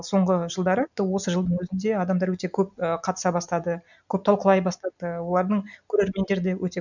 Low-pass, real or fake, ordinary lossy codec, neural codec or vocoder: 7.2 kHz; real; none; none